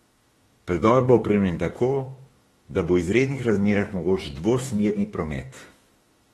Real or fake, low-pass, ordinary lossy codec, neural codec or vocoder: fake; 19.8 kHz; AAC, 32 kbps; autoencoder, 48 kHz, 32 numbers a frame, DAC-VAE, trained on Japanese speech